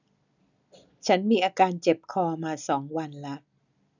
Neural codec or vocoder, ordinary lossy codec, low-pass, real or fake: none; none; 7.2 kHz; real